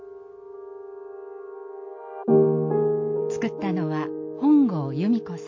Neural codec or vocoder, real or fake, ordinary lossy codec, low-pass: none; real; MP3, 48 kbps; 7.2 kHz